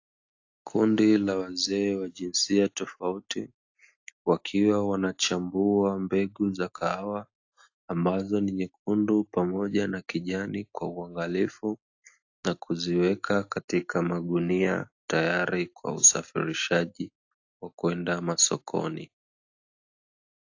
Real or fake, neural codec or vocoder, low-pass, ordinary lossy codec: real; none; 7.2 kHz; AAC, 48 kbps